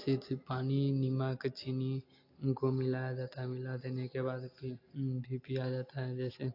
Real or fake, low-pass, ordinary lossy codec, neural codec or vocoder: real; 5.4 kHz; AAC, 32 kbps; none